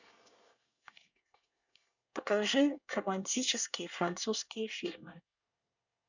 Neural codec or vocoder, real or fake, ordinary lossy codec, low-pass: codec, 24 kHz, 1 kbps, SNAC; fake; none; 7.2 kHz